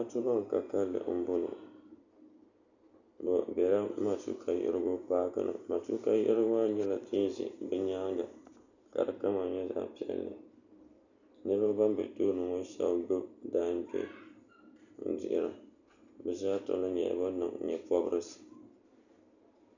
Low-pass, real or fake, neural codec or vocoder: 7.2 kHz; real; none